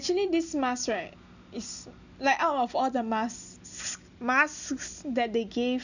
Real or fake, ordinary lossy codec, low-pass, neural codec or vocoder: real; none; 7.2 kHz; none